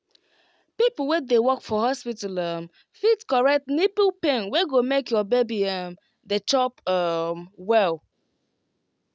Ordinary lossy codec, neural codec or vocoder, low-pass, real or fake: none; none; none; real